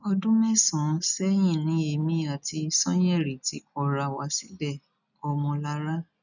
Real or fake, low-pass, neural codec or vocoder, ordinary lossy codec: real; 7.2 kHz; none; none